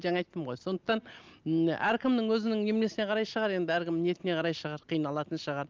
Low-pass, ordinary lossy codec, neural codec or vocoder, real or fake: 7.2 kHz; Opus, 24 kbps; none; real